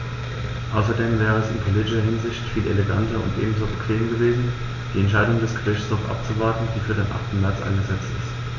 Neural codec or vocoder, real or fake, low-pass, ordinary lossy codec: none; real; 7.2 kHz; none